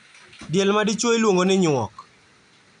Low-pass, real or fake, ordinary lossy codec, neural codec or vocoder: 9.9 kHz; real; none; none